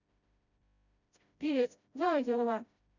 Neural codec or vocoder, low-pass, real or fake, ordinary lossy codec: codec, 16 kHz, 0.5 kbps, FreqCodec, smaller model; 7.2 kHz; fake; none